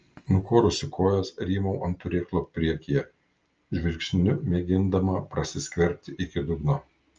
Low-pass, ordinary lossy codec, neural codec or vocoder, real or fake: 7.2 kHz; Opus, 24 kbps; none; real